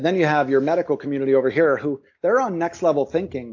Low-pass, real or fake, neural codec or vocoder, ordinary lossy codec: 7.2 kHz; real; none; AAC, 48 kbps